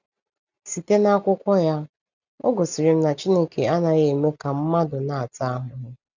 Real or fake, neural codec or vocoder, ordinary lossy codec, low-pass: real; none; none; 7.2 kHz